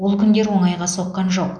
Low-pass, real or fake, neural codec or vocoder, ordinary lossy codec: 9.9 kHz; real; none; none